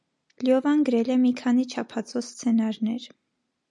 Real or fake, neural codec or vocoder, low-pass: real; none; 10.8 kHz